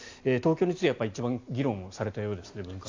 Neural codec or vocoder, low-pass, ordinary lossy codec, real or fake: none; 7.2 kHz; AAC, 48 kbps; real